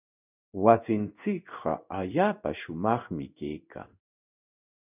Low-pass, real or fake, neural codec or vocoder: 3.6 kHz; fake; codec, 16 kHz in and 24 kHz out, 1 kbps, XY-Tokenizer